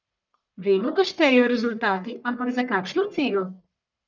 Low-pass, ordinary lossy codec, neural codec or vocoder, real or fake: 7.2 kHz; none; codec, 44.1 kHz, 1.7 kbps, Pupu-Codec; fake